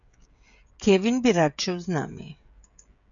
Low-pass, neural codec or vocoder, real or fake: 7.2 kHz; codec, 16 kHz, 16 kbps, FreqCodec, smaller model; fake